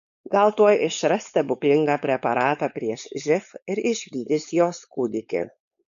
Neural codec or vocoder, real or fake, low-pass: codec, 16 kHz, 4.8 kbps, FACodec; fake; 7.2 kHz